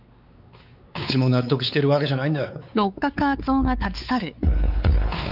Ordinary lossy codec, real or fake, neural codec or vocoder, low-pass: none; fake; codec, 16 kHz, 4 kbps, X-Codec, WavLM features, trained on Multilingual LibriSpeech; 5.4 kHz